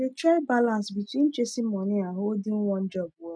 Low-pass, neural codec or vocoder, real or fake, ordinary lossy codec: none; none; real; none